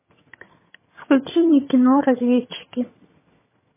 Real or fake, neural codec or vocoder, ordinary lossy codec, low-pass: fake; vocoder, 22.05 kHz, 80 mel bands, HiFi-GAN; MP3, 16 kbps; 3.6 kHz